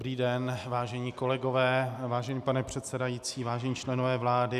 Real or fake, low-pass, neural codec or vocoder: real; 14.4 kHz; none